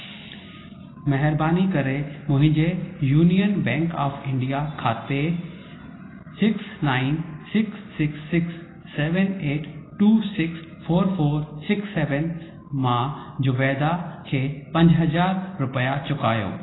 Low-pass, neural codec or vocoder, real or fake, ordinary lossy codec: 7.2 kHz; none; real; AAC, 16 kbps